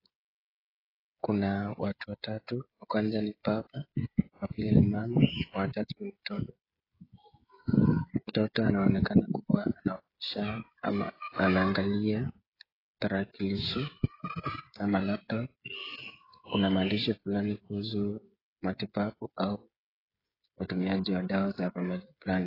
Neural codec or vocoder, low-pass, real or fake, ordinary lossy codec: codec, 16 kHz, 16 kbps, FreqCodec, smaller model; 5.4 kHz; fake; AAC, 24 kbps